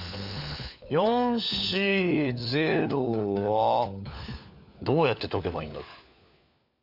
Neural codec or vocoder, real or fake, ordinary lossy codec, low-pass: codec, 16 kHz, 4 kbps, FunCodec, trained on LibriTTS, 50 frames a second; fake; none; 5.4 kHz